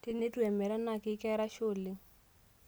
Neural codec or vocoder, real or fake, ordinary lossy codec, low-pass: none; real; none; none